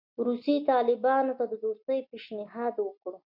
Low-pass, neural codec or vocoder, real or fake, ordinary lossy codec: 5.4 kHz; none; real; MP3, 48 kbps